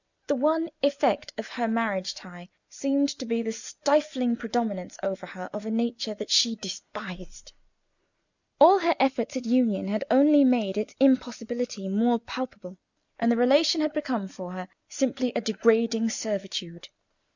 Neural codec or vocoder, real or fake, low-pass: none; real; 7.2 kHz